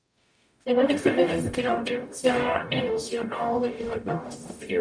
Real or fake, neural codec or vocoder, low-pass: fake; codec, 44.1 kHz, 0.9 kbps, DAC; 9.9 kHz